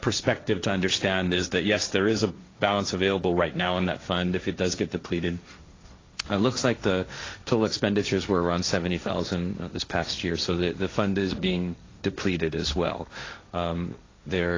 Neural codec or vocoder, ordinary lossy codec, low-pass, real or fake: codec, 16 kHz, 1.1 kbps, Voila-Tokenizer; AAC, 32 kbps; 7.2 kHz; fake